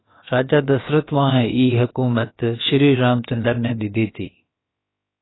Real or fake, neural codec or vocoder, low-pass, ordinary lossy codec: fake; codec, 16 kHz, about 1 kbps, DyCAST, with the encoder's durations; 7.2 kHz; AAC, 16 kbps